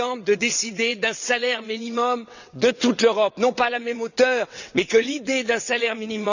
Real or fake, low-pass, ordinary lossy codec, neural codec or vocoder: fake; 7.2 kHz; none; vocoder, 22.05 kHz, 80 mel bands, WaveNeXt